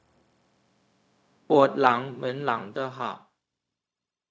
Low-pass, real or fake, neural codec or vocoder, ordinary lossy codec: none; fake; codec, 16 kHz, 0.4 kbps, LongCat-Audio-Codec; none